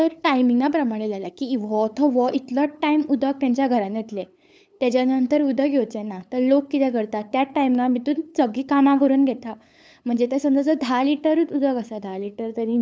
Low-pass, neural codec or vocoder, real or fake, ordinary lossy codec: none; codec, 16 kHz, 8 kbps, FunCodec, trained on LibriTTS, 25 frames a second; fake; none